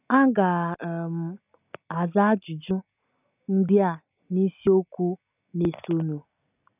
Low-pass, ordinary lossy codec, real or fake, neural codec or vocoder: 3.6 kHz; none; real; none